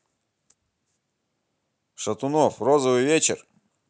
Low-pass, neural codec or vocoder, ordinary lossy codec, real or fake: none; none; none; real